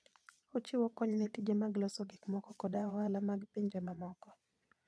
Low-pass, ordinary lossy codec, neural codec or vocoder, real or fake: none; none; vocoder, 22.05 kHz, 80 mel bands, Vocos; fake